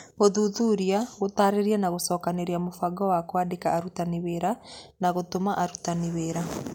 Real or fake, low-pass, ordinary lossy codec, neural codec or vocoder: real; 19.8 kHz; MP3, 96 kbps; none